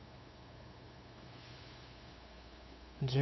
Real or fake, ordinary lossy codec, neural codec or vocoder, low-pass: fake; MP3, 24 kbps; codec, 16 kHz, 8 kbps, FunCodec, trained on LibriTTS, 25 frames a second; 7.2 kHz